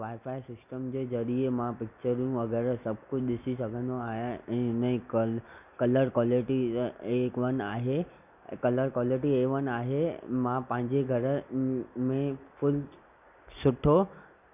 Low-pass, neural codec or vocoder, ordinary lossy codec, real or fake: 3.6 kHz; none; none; real